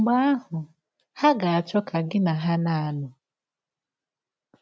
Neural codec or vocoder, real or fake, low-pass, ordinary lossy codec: none; real; none; none